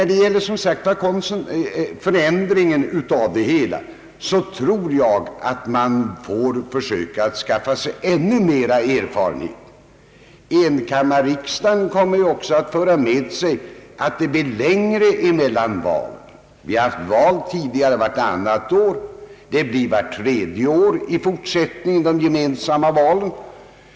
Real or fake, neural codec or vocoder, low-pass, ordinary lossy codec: real; none; none; none